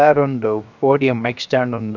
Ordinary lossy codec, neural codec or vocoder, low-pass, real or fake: none; codec, 16 kHz, 0.7 kbps, FocalCodec; 7.2 kHz; fake